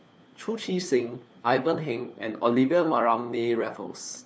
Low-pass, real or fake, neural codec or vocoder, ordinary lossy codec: none; fake; codec, 16 kHz, 4 kbps, FunCodec, trained on LibriTTS, 50 frames a second; none